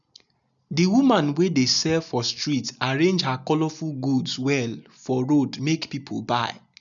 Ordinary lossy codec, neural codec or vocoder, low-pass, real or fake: none; none; 7.2 kHz; real